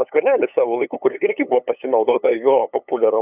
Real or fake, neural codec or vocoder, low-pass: fake; codec, 16 kHz, 8 kbps, FunCodec, trained on LibriTTS, 25 frames a second; 3.6 kHz